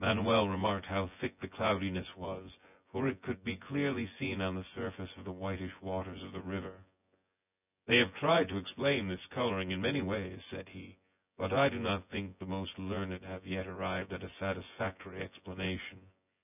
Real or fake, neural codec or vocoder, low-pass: fake; vocoder, 24 kHz, 100 mel bands, Vocos; 3.6 kHz